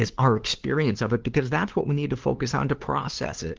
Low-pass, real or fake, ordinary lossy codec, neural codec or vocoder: 7.2 kHz; fake; Opus, 16 kbps; codec, 16 kHz, 2 kbps, FunCodec, trained on LibriTTS, 25 frames a second